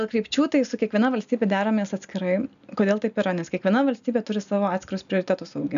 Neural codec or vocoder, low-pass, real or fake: none; 7.2 kHz; real